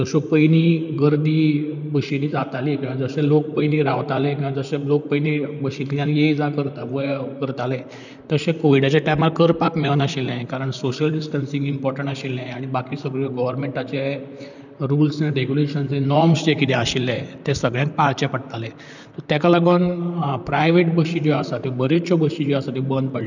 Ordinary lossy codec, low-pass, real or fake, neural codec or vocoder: none; 7.2 kHz; fake; vocoder, 44.1 kHz, 128 mel bands, Pupu-Vocoder